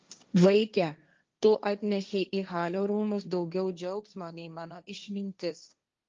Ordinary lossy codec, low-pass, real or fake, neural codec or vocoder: Opus, 24 kbps; 7.2 kHz; fake; codec, 16 kHz, 1.1 kbps, Voila-Tokenizer